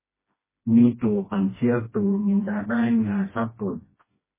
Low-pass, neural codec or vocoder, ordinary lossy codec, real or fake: 3.6 kHz; codec, 16 kHz, 1 kbps, FreqCodec, smaller model; MP3, 16 kbps; fake